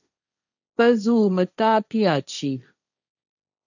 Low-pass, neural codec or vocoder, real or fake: 7.2 kHz; codec, 16 kHz, 1.1 kbps, Voila-Tokenizer; fake